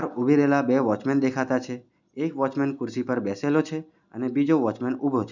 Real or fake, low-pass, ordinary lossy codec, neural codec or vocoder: real; 7.2 kHz; none; none